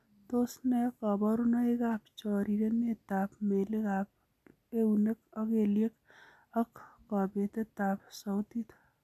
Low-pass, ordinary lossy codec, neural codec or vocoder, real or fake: 14.4 kHz; none; none; real